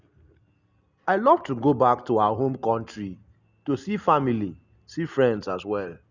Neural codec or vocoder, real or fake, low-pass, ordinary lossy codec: none; real; 7.2 kHz; Opus, 64 kbps